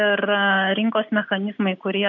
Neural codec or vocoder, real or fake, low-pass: none; real; 7.2 kHz